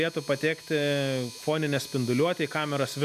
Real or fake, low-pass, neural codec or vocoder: real; 14.4 kHz; none